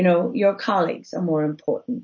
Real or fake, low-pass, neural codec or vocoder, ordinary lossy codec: real; 7.2 kHz; none; MP3, 32 kbps